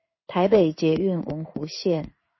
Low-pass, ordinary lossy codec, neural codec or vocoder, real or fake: 7.2 kHz; MP3, 24 kbps; codec, 16 kHz in and 24 kHz out, 1 kbps, XY-Tokenizer; fake